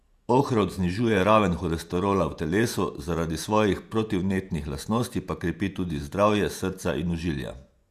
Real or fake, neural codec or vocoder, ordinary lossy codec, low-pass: fake; vocoder, 48 kHz, 128 mel bands, Vocos; Opus, 64 kbps; 14.4 kHz